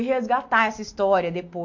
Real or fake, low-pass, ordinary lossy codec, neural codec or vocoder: real; 7.2 kHz; MP3, 48 kbps; none